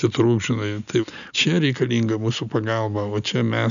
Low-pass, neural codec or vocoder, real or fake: 7.2 kHz; none; real